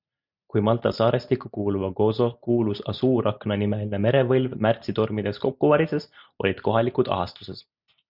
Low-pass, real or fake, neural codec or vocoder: 5.4 kHz; real; none